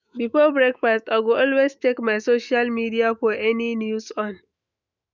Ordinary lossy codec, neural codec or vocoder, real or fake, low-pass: none; autoencoder, 48 kHz, 128 numbers a frame, DAC-VAE, trained on Japanese speech; fake; 7.2 kHz